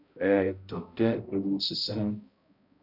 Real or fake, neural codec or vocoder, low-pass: fake; codec, 16 kHz, 0.5 kbps, X-Codec, HuBERT features, trained on balanced general audio; 5.4 kHz